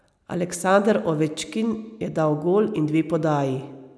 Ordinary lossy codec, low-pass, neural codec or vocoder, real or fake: none; none; none; real